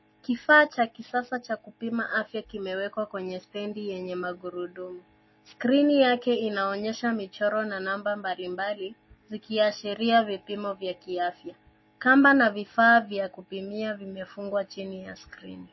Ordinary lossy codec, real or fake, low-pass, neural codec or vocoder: MP3, 24 kbps; real; 7.2 kHz; none